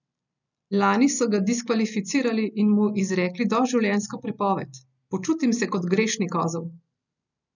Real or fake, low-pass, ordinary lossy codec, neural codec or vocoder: real; 7.2 kHz; none; none